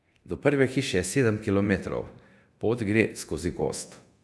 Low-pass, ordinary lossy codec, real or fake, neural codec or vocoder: none; none; fake; codec, 24 kHz, 0.9 kbps, DualCodec